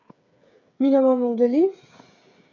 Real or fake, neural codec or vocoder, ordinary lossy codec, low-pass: fake; codec, 16 kHz, 8 kbps, FreqCodec, smaller model; none; 7.2 kHz